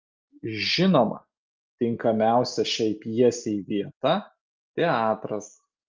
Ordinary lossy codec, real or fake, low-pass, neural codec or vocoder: Opus, 24 kbps; real; 7.2 kHz; none